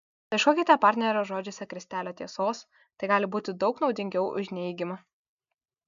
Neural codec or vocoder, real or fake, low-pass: none; real; 7.2 kHz